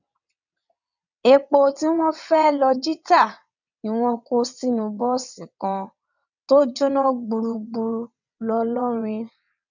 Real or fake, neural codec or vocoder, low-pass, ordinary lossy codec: fake; vocoder, 22.05 kHz, 80 mel bands, WaveNeXt; 7.2 kHz; none